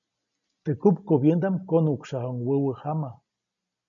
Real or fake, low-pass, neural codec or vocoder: real; 7.2 kHz; none